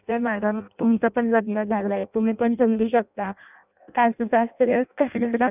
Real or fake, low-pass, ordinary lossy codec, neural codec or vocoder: fake; 3.6 kHz; none; codec, 16 kHz in and 24 kHz out, 0.6 kbps, FireRedTTS-2 codec